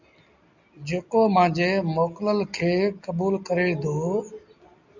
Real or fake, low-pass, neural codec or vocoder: real; 7.2 kHz; none